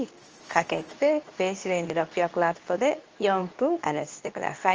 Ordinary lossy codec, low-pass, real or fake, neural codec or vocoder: Opus, 24 kbps; 7.2 kHz; fake; codec, 24 kHz, 0.9 kbps, WavTokenizer, medium speech release version 2